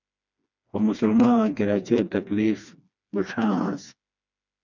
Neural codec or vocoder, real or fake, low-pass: codec, 16 kHz, 2 kbps, FreqCodec, smaller model; fake; 7.2 kHz